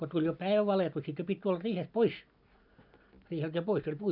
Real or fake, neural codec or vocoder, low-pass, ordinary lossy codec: real; none; 5.4 kHz; none